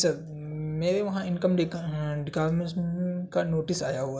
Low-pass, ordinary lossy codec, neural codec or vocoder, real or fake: none; none; none; real